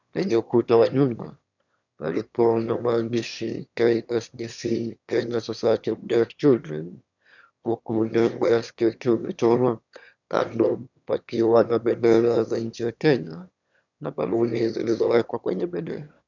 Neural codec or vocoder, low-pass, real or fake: autoencoder, 22.05 kHz, a latent of 192 numbers a frame, VITS, trained on one speaker; 7.2 kHz; fake